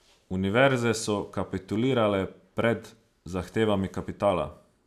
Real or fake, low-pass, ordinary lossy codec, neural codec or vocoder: real; 14.4 kHz; none; none